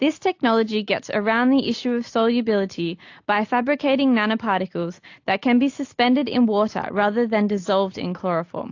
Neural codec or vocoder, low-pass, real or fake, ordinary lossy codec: none; 7.2 kHz; real; AAC, 48 kbps